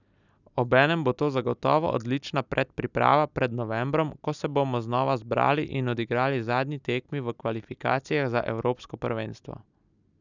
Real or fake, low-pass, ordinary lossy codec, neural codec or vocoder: real; 7.2 kHz; none; none